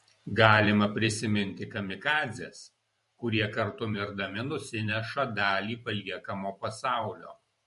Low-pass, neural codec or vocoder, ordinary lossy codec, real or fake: 14.4 kHz; vocoder, 44.1 kHz, 128 mel bands every 512 samples, BigVGAN v2; MP3, 48 kbps; fake